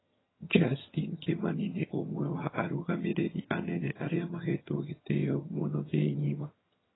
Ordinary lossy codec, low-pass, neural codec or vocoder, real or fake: AAC, 16 kbps; 7.2 kHz; vocoder, 22.05 kHz, 80 mel bands, HiFi-GAN; fake